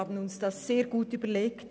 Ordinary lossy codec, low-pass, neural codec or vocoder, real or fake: none; none; none; real